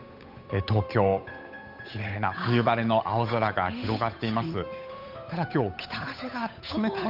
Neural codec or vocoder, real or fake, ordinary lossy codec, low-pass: codec, 16 kHz, 8 kbps, FunCodec, trained on Chinese and English, 25 frames a second; fake; none; 5.4 kHz